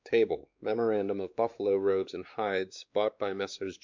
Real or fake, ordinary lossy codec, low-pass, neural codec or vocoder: fake; MP3, 64 kbps; 7.2 kHz; codec, 16 kHz, 2 kbps, X-Codec, WavLM features, trained on Multilingual LibriSpeech